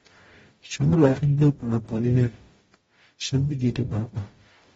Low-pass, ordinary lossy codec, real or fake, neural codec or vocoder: 19.8 kHz; AAC, 24 kbps; fake; codec, 44.1 kHz, 0.9 kbps, DAC